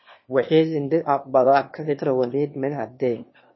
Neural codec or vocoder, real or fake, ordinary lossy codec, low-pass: autoencoder, 22.05 kHz, a latent of 192 numbers a frame, VITS, trained on one speaker; fake; MP3, 24 kbps; 7.2 kHz